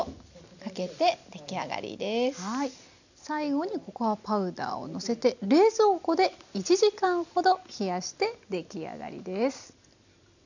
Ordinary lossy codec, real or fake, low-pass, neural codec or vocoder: none; real; 7.2 kHz; none